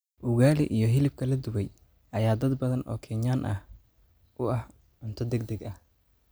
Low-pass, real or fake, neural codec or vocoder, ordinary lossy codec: none; real; none; none